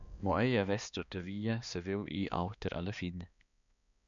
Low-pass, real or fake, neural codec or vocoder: 7.2 kHz; fake; codec, 16 kHz, 2 kbps, X-Codec, HuBERT features, trained on balanced general audio